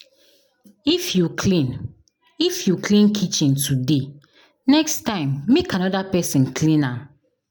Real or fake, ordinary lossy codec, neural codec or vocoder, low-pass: real; none; none; none